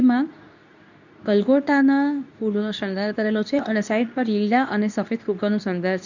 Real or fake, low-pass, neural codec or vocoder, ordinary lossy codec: fake; 7.2 kHz; codec, 24 kHz, 0.9 kbps, WavTokenizer, medium speech release version 2; none